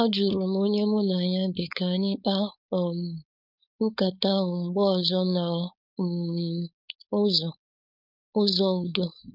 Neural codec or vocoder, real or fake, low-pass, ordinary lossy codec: codec, 16 kHz, 4.8 kbps, FACodec; fake; 5.4 kHz; none